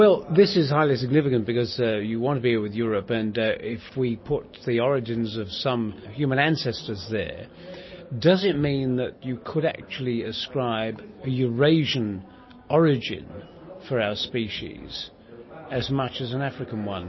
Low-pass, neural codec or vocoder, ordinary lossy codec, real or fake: 7.2 kHz; none; MP3, 24 kbps; real